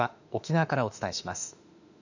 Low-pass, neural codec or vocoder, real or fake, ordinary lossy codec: 7.2 kHz; autoencoder, 48 kHz, 32 numbers a frame, DAC-VAE, trained on Japanese speech; fake; none